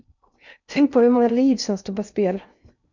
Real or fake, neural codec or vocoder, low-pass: fake; codec, 16 kHz in and 24 kHz out, 0.6 kbps, FocalCodec, streaming, 2048 codes; 7.2 kHz